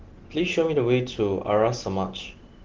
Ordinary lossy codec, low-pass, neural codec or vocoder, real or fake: Opus, 16 kbps; 7.2 kHz; none; real